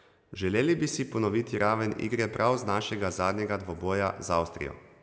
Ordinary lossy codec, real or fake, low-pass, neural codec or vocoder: none; real; none; none